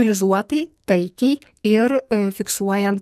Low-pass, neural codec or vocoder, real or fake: 14.4 kHz; codec, 44.1 kHz, 2.6 kbps, SNAC; fake